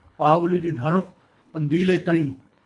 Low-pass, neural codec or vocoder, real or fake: 10.8 kHz; codec, 24 kHz, 1.5 kbps, HILCodec; fake